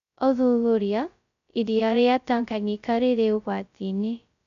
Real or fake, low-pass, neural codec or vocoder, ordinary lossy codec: fake; 7.2 kHz; codec, 16 kHz, 0.2 kbps, FocalCodec; none